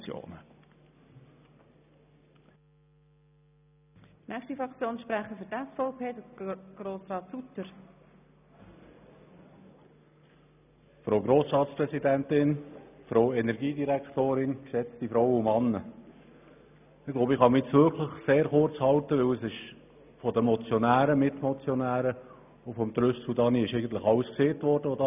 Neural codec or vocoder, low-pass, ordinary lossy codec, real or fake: none; 3.6 kHz; none; real